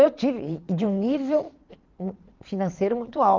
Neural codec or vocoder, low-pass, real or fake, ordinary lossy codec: vocoder, 22.05 kHz, 80 mel bands, Vocos; 7.2 kHz; fake; Opus, 32 kbps